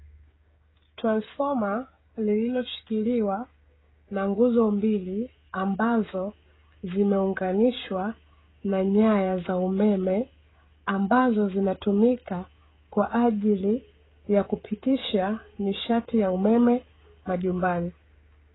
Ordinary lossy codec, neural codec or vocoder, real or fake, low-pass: AAC, 16 kbps; codec, 16 kHz, 16 kbps, FreqCodec, smaller model; fake; 7.2 kHz